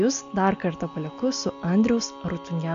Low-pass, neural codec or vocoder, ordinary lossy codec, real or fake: 7.2 kHz; none; MP3, 64 kbps; real